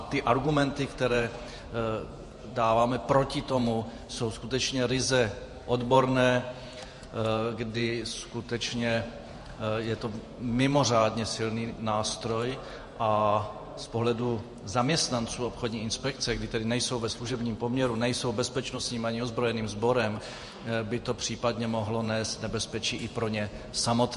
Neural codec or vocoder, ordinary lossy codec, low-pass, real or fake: vocoder, 48 kHz, 128 mel bands, Vocos; MP3, 48 kbps; 14.4 kHz; fake